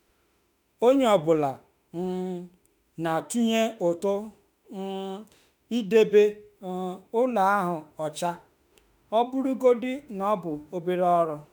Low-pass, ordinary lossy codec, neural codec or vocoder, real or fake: none; none; autoencoder, 48 kHz, 32 numbers a frame, DAC-VAE, trained on Japanese speech; fake